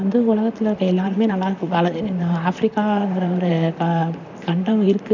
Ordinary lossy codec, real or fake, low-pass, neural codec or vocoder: none; fake; 7.2 kHz; vocoder, 44.1 kHz, 128 mel bands, Pupu-Vocoder